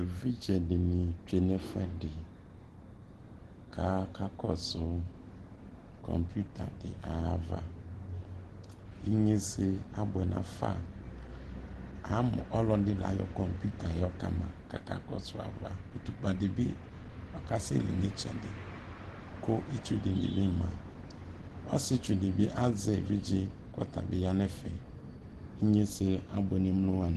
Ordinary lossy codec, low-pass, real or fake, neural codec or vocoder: Opus, 16 kbps; 10.8 kHz; real; none